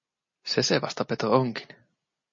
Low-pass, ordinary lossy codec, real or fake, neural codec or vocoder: 7.2 kHz; MP3, 32 kbps; real; none